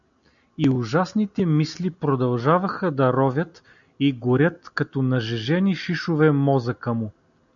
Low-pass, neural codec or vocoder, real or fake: 7.2 kHz; none; real